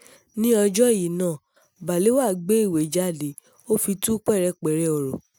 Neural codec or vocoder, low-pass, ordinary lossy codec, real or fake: none; none; none; real